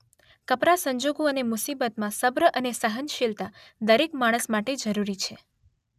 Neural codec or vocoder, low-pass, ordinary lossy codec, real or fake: none; 14.4 kHz; none; real